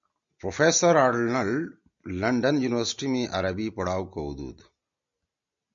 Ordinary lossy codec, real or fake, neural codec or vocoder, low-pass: MP3, 64 kbps; real; none; 7.2 kHz